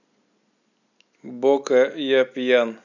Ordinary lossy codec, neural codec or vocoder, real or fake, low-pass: none; none; real; 7.2 kHz